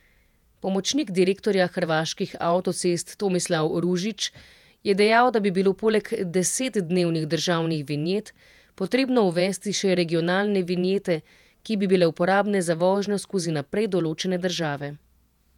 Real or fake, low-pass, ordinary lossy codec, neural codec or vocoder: fake; 19.8 kHz; none; vocoder, 48 kHz, 128 mel bands, Vocos